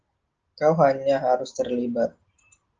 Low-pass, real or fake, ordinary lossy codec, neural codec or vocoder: 7.2 kHz; real; Opus, 16 kbps; none